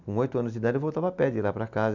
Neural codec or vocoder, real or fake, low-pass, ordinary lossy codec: none; real; 7.2 kHz; none